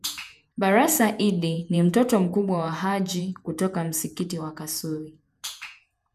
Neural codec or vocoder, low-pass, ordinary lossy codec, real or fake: vocoder, 48 kHz, 128 mel bands, Vocos; 14.4 kHz; none; fake